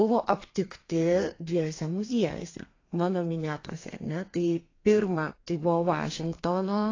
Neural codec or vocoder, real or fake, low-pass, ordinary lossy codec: codec, 32 kHz, 1.9 kbps, SNAC; fake; 7.2 kHz; AAC, 32 kbps